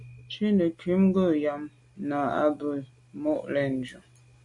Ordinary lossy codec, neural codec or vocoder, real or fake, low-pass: MP3, 48 kbps; none; real; 10.8 kHz